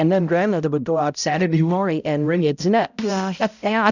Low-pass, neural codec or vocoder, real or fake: 7.2 kHz; codec, 16 kHz, 0.5 kbps, X-Codec, HuBERT features, trained on balanced general audio; fake